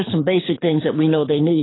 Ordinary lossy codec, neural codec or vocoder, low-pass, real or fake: AAC, 16 kbps; codec, 24 kHz, 6 kbps, HILCodec; 7.2 kHz; fake